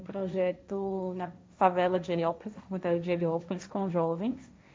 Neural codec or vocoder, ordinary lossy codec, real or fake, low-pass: codec, 16 kHz, 1.1 kbps, Voila-Tokenizer; none; fake; none